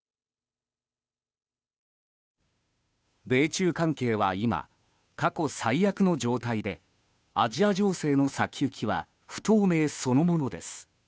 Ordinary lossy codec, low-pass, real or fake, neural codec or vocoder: none; none; fake; codec, 16 kHz, 2 kbps, FunCodec, trained on Chinese and English, 25 frames a second